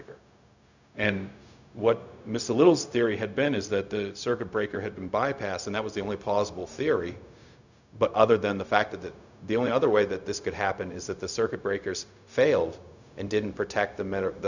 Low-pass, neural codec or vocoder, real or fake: 7.2 kHz; codec, 16 kHz, 0.4 kbps, LongCat-Audio-Codec; fake